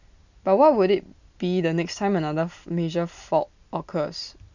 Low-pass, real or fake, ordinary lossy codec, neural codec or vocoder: 7.2 kHz; real; none; none